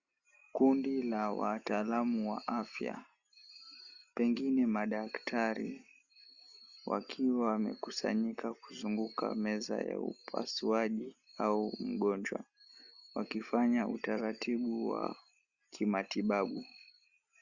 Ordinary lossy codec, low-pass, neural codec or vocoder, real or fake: Opus, 64 kbps; 7.2 kHz; none; real